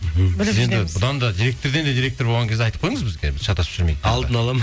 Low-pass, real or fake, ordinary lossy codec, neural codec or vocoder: none; real; none; none